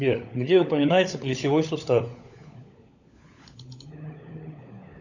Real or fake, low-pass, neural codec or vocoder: fake; 7.2 kHz; codec, 16 kHz, 16 kbps, FunCodec, trained on LibriTTS, 50 frames a second